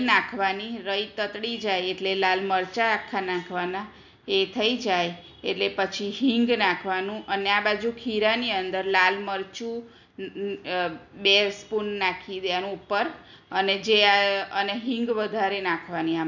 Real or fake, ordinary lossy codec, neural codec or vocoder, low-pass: real; none; none; 7.2 kHz